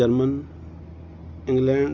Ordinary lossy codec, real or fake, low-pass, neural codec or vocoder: none; real; 7.2 kHz; none